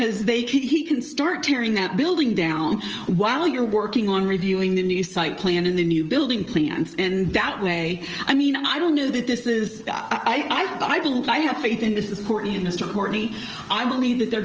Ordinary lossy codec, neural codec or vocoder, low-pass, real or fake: Opus, 24 kbps; codec, 16 kHz, 16 kbps, FreqCodec, smaller model; 7.2 kHz; fake